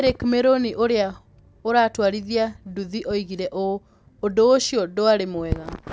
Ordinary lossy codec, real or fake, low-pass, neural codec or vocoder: none; real; none; none